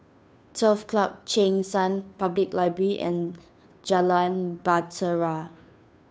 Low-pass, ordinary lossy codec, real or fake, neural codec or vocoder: none; none; fake; codec, 16 kHz, 2 kbps, FunCodec, trained on Chinese and English, 25 frames a second